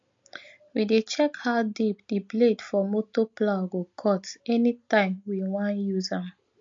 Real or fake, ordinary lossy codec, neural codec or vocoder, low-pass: real; MP3, 48 kbps; none; 7.2 kHz